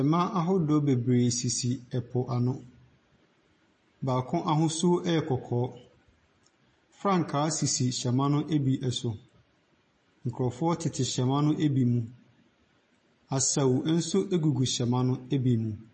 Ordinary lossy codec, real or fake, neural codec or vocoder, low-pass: MP3, 32 kbps; real; none; 10.8 kHz